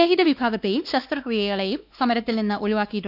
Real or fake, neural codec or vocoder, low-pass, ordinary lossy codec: fake; codec, 16 kHz, 2 kbps, X-Codec, WavLM features, trained on Multilingual LibriSpeech; 5.4 kHz; none